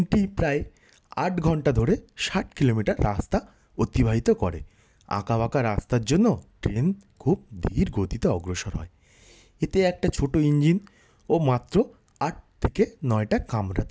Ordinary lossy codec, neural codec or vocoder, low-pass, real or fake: none; none; none; real